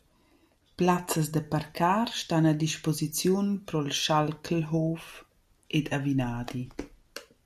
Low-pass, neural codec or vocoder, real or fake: 14.4 kHz; none; real